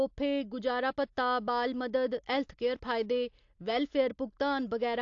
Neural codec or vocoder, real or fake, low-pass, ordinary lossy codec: none; real; 7.2 kHz; AAC, 48 kbps